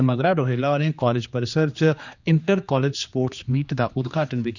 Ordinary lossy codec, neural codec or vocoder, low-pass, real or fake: none; codec, 16 kHz, 2 kbps, X-Codec, HuBERT features, trained on general audio; 7.2 kHz; fake